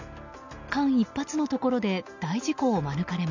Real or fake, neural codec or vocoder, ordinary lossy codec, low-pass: real; none; none; 7.2 kHz